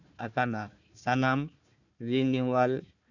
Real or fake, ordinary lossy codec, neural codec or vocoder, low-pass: fake; none; codec, 16 kHz, 1 kbps, FunCodec, trained on Chinese and English, 50 frames a second; 7.2 kHz